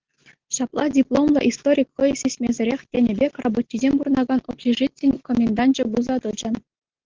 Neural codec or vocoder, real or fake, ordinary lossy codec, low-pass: none; real; Opus, 16 kbps; 7.2 kHz